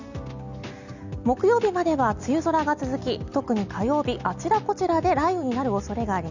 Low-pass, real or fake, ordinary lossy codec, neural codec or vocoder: 7.2 kHz; real; none; none